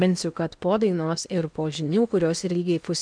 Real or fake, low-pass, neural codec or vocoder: fake; 9.9 kHz; codec, 16 kHz in and 24 kHz out, 0.8 kbps, FocalCodec, streaming, 65536 codes